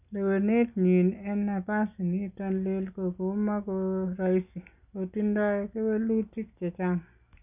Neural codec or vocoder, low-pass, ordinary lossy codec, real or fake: none; 3.6 kHz; none; real